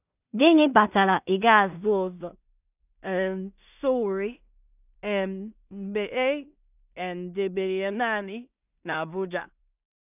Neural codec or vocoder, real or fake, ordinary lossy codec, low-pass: codec, 16 kHz in and 24 kHz out, 0.4 kbps, LongCat-Audio-Codec, two codebook decoder; fake; none; 3.6 kHz